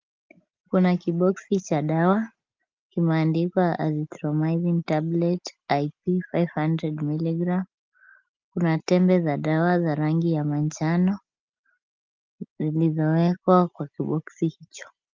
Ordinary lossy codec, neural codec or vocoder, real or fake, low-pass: Opus, 24 kbps; none; real; 7.2 kHz